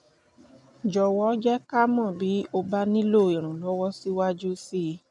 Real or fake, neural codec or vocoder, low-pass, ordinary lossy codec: real; none; 10.8 kHz; none